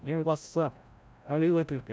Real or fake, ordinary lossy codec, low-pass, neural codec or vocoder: fake; none; none; codec, 16 kHz, 0.5 kbps, FreqCodec, larger model